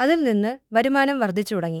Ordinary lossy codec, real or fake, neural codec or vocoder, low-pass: none; fake; autoencoder, 48 kHz, 32 numbers a frame, DAC-VAE, trained on Japanese speech; 19.8 kHz